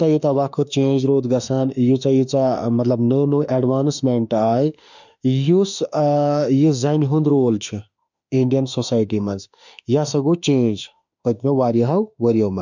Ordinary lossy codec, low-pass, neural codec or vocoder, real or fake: none; 7.2 kHz; autoencoder, 48 kHz, 32 numbers a frame, DAC-VAE, trained on Japanese speech; fake